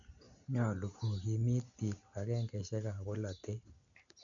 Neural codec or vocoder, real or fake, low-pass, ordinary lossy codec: none; real; 7.2 kHz; none